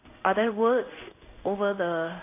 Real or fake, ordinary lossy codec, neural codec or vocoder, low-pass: fake; none; codec, 16 kHz in and 24 kHz out, 1 kbps, XY-Tokenizer; 3.6 kHz